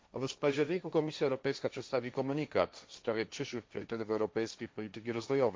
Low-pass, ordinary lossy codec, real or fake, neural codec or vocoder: none; none; fake; codec, 16 kHz, 1.1 kbps, Voila-Tokenizer